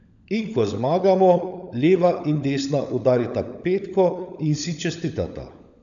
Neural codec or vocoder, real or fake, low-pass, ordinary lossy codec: codec, 16 kHz, 16 kbps, FunCodec, trained on LibriTTS, 50 frames a second; fake; 7.2 kHz; none